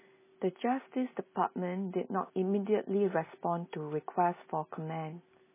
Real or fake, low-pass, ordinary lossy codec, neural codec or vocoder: real; 3.6 kHz; MP3, 16 kbps; none